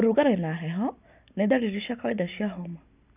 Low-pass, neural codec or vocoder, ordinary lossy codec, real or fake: 3.6 kHz; none; none; real